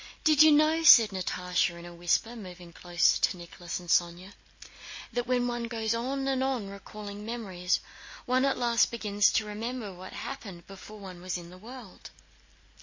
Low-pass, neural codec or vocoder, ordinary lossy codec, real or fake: 7.2 kHz; none; MP3, 32 kbps; real